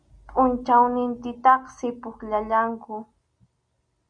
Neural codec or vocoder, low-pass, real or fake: none; 9.9 kHz; real